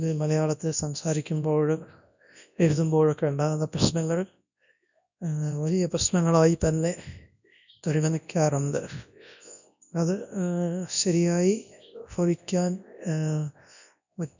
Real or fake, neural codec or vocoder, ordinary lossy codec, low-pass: fake; codec, 24 kHz, 0.9 kbps, WavTokenizer, large speech release; none; 7.2 kHz